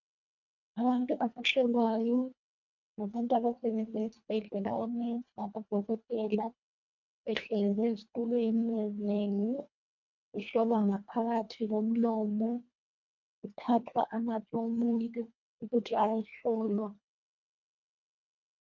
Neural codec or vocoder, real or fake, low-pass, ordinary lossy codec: codec, 24 kHz, 1.5 kbps, HILCodec; fake; 7.2 kHz; MP3, 48 kbps